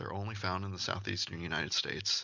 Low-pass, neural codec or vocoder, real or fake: 7.2 kHz; none; real